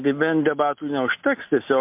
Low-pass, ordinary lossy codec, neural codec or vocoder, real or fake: 3.6 kHz; MP3, 32 kbps; none; real